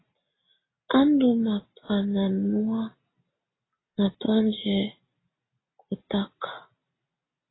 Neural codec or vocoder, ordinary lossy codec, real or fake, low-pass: none; AAC, 16 kbps; real; 7.2 kHz